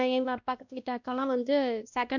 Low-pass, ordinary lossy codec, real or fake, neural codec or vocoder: 7.2 kHz; none; fake; codec, 16 kHz, 1 kbps, X-Codec, WavLM features, trained on Multilingual LibriSpeech